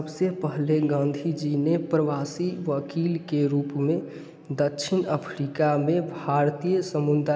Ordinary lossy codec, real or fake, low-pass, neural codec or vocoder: none; real; none; none